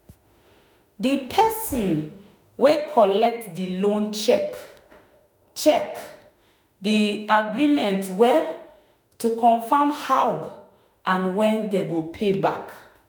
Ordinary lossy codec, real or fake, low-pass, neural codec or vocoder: none; fake; none; autoencoder, 48 kHz, 32 numbers a frame, DAC-VAE, trained on Japanese speech